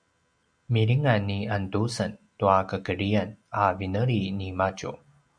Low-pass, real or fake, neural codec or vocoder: 9.9 kHz; real; none